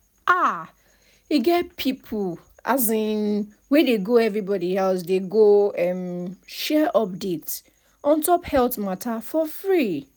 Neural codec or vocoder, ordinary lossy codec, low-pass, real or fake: none; none; none; real